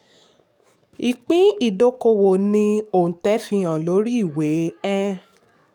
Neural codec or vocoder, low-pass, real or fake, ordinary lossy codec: codec, 44.1 kHz, 7.8 kbps, DAC; 19.8 kHz; fake; none